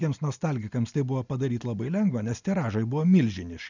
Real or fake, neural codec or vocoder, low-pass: real; none; 7.2 kHz